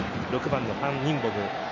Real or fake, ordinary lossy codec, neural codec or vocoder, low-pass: real; none; none; 7.2 kHz